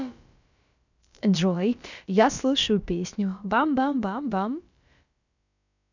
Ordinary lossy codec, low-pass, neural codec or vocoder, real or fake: none; 7.2 kHz; codec, 16 kHz, about 1 kbps, DyCAST, with the encoder's durations; fake